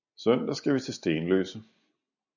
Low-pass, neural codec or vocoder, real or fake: 7.2 kHz; none; real